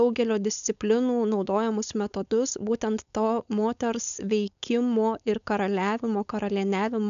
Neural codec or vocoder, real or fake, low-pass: codec, 16 kHz, 4.8 kbps, FACodec; fake; 7.2 kHz